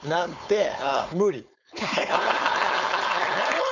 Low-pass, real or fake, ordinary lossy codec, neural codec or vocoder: 7.2 kHz; fake; none; codec, 16 kHz, 4.8 kbps, FACodec